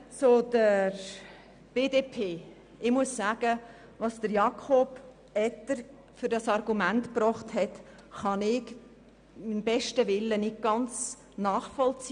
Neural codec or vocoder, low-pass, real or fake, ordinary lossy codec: none; 9.9 kHz; real; none